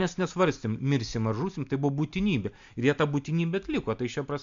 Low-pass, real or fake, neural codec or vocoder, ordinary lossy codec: 7.2 kHz; real; none; MP3, 64 kbps